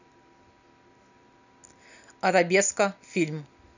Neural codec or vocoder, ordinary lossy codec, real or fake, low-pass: none; none; real; 7.2 kHz